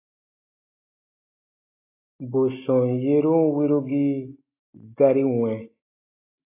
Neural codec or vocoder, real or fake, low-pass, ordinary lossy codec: none; real; 3.6 kHz; AAC, 24 kbps